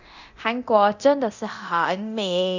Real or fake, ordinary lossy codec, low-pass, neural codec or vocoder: fake; Opus, 64 kbps; 7.2 kHz; codec, 24 kHz, 0.9 kbps, DualCodec